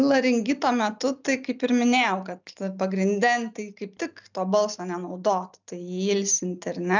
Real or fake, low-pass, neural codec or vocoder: real; 7.2 kHz; none